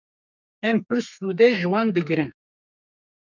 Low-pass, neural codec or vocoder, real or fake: 7.2 kHz; codec, 32 kHz, 1.9 kbps, SNAC; fake